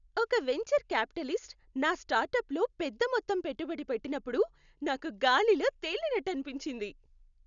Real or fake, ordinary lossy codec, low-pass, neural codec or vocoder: real; none; 7.2 kHz; none